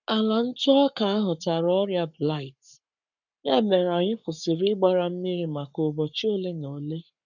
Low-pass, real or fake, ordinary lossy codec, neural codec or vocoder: 7.2 kHz; fake; none; codec, 44.1 kHz, 7.8 kbps, Pupu-Codec